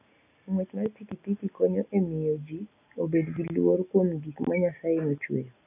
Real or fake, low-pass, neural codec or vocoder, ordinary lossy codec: real; 3.6 kHz; none; none